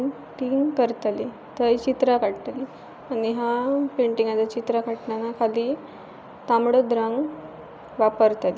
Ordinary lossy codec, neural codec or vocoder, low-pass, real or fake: none; none; none; real